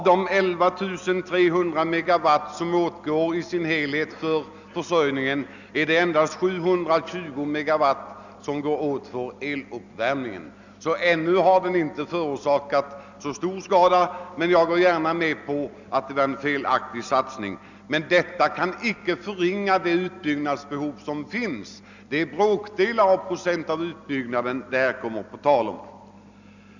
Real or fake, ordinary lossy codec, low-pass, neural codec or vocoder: real; none; 7.2 kHz; none